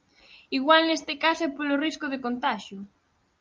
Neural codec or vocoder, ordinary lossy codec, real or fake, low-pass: none; Opus, 24 kbps; real; 7.2 kHz